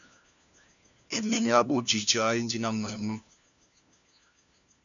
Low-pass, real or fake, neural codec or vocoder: 7.2 kHz; fake; codec, 16 kHz, 1 kbps, FunCodec, trained on LibriTTS, 50 frames a second